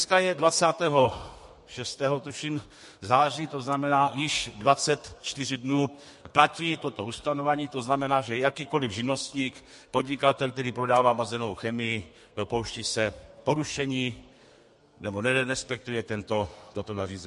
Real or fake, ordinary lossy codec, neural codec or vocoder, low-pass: fake; MP3, 48 kbps; codec, 32 kHz, 1.9 kbps, SNAC; 14.4 kHz